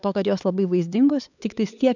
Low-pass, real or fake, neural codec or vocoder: 7.2 kHz; fake; codec, 16 kHz, 4 kbps, X-Codec, HuBERT features, trained on LibriSpeech